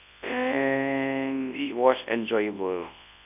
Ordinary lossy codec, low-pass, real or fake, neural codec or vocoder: none; 3.6 kHz; fake; codec, 24 kHz, 0.9 kbps, WavTokenizer, large speech release